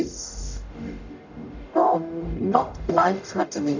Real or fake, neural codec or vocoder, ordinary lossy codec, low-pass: fake; codec, 44.1 kHz, 0.9 kbps, DAC; none; 7.2 kHz